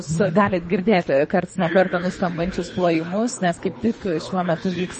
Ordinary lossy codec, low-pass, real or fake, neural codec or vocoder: MP3, 32 kbps; 10.8 kHz; fake; codec, 24 kHz, 3 kbps, HILCodec